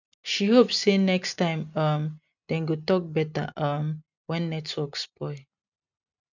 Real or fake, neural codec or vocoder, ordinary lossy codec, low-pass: real; none; none; 7.2 kHz